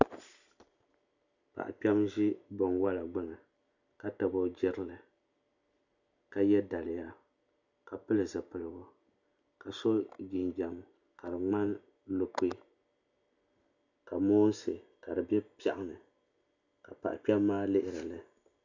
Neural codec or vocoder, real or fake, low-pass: none; real; 7.2 kHz